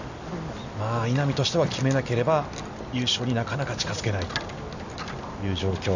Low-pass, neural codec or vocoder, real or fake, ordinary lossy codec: 7.2 kHz; none; real; none